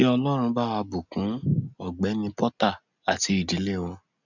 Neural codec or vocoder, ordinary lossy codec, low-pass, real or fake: none; none; 7.2 kHz; real